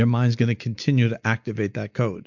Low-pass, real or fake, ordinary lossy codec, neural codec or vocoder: 7.2 kHz; real; MP3, 64 kbps; none